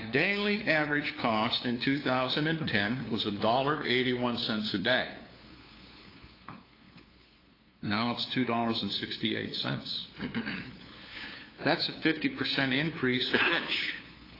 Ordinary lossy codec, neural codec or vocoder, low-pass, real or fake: AAC, 24 kbps; codec, 16 kHz, 2 kbps, FunCodec, trained on LibriTTS, 25 frames a second; 5.4 kHz; fake